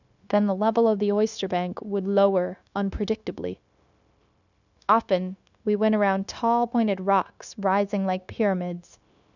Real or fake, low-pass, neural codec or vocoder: fake; 7.2 kHz; codec, 16 kHz, 0.9 kbps, LongCat-Audio-Codec